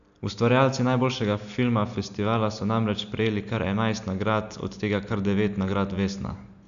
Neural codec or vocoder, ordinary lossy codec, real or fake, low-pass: none; none; real; 7.2 kHz